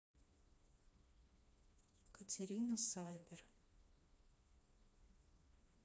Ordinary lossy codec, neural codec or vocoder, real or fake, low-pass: none; codec, 16 kHz, 2 kbps, FreqCodec, smaller model; fake; none